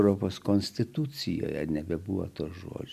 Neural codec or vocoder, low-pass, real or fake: none; 14.4 kHz; real